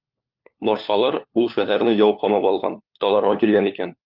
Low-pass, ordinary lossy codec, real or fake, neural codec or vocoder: 5.4 kHz; Opus, 24 kbps; fake; codec, 16 kHz, 4 kbps, FunCodec, trained on LibriTTS, 50 frames a second